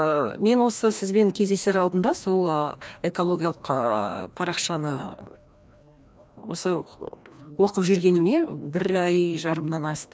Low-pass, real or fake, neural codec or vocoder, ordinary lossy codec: none; fake; codec, 16 kHz, 1 kbps, FreqCodec, larger model; none